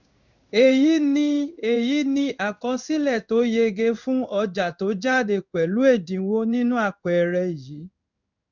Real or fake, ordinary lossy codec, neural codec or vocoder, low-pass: fake; none; codec, 16 kHz in and 24 kHz out, 1 kbps, XY-Tokenizer; 7.2 kHz